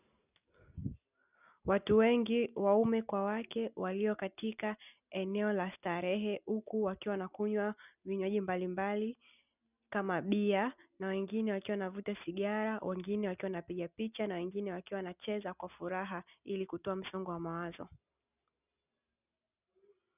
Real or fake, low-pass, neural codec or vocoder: real; 3.6 kHz; none